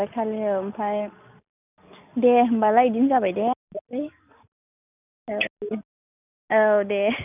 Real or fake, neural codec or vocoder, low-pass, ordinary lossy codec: real; none; 3.6 kHz; none